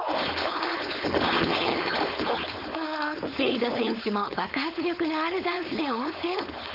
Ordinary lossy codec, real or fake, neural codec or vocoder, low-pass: none; fake; codec, 16 kHz, 4.8 kbps, FACodec; 5.4 kHz